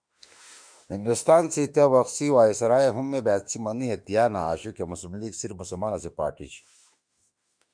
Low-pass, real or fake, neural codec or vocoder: 9.9 kHz; fake; autoencoder, 48 kHz, 32 numbers a frame, DAC-VAE, trained on Japanese speech